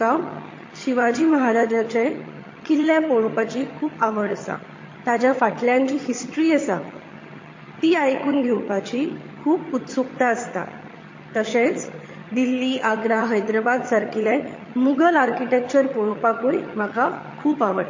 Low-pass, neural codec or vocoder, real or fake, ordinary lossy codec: 7.2 kHz; vocoder, 22.05 kHz, 80 mel bands, HiFi-GAN; fake; MP3, 32 kbps